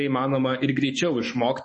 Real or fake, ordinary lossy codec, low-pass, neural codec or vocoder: fake; MP3, 32 kbps; 10.8 kHz; autoencoder, 48 kHz, 128 numbers a frame, DAC-VAE, trained on Japanese speech